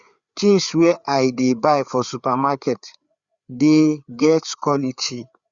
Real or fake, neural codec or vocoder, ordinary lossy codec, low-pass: fake; codec, 16 kHz, 4 kbps, FreqCodec, larger model; Opus, 64 kbps; 7.2 kHz